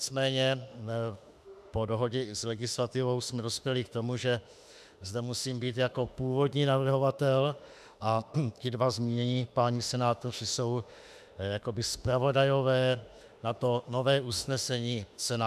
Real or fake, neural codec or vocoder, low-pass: fake; autoencoder, 48 kHz, 32 numbers a frame, DAC-VAE, trained on Japanese speech; 14.4 kHz